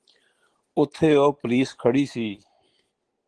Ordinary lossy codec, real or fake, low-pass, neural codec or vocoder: Opus, 16 kbps; real; 9.9 kHz; none